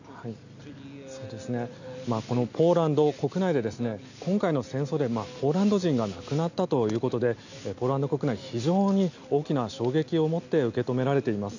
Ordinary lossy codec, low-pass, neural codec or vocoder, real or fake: none; 7.2 kHz; none; real